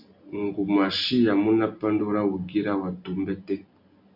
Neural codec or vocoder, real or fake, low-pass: none; real; 5.4 kHz